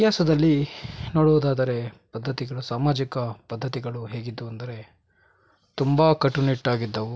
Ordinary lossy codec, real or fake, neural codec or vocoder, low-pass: none; real; none; none